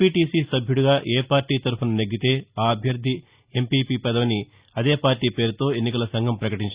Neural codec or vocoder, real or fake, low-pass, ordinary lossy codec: none; real; 3.6 kHz; Opus, 64 kbps